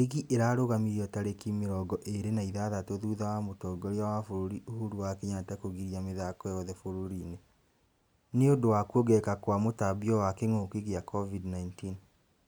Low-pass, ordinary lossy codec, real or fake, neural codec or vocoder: none; none; real; none